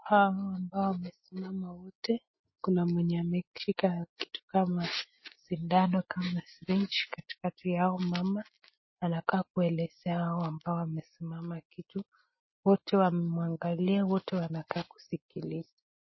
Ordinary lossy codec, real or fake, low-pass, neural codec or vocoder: MP3, 24 kbps; real; 7.2 kHz; none